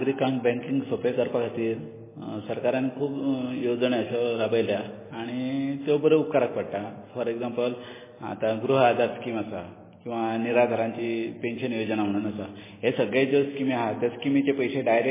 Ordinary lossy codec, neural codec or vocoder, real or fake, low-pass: MP3, 16 kbps; none; real; 3.6 kHz